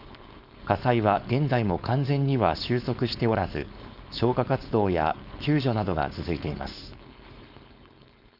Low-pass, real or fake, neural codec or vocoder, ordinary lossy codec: 5.4 kHz; fake; codec, 16 kHz, 4.8 kbps, FACodec; none